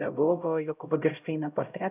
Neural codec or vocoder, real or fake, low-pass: codec, 16 kHz, 0.5 kbps, X-Codec, HuBERT features, trained on LibriSpeech; fake; 3.6 kHz